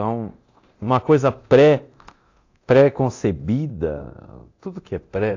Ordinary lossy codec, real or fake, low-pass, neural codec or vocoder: AAC, 48 kbps; fake; 7.2 kHz; codec, 24 kHz, 0.9 kbps, DualCodec